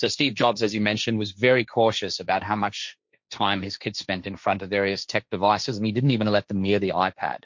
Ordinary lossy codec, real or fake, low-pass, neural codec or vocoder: MP3, 48 kbps; fake; 7.2 kHz; codec, 16 kHz, 1.1 kbps, Voila-Tokenizer